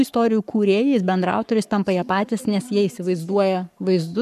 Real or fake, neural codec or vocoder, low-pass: fake; codec, 44.1 kHz, 7.8 kbps, Pupu-Codec; 14.4 kHz